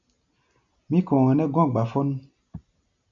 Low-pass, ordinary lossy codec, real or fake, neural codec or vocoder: 7.2 kHz; MP3, 96 kbps; real; none